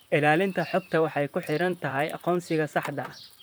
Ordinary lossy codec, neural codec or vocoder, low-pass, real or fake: none; vocoder, 44.1 kHz, 128 mel bands, Pupu-Vocoder; none; fake